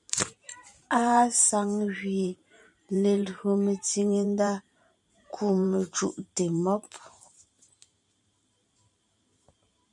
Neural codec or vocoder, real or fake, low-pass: vocoder, 44.1 kHz, 128 mel bands every 512 samples, BigVGAN v2; fake; 10.8 kHz